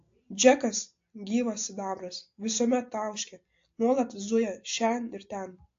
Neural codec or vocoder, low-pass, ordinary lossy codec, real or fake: none; 7.2 kHz; AAC, 48 kbps; real